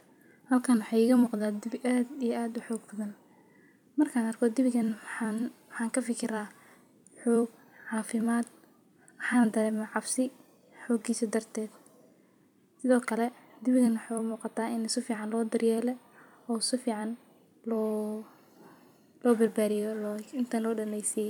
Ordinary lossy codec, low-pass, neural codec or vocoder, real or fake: none; 19.8 kHz; vocoder, 44.1 kHz, 128 mel bands every 256 samples, BigVGAN v2; fake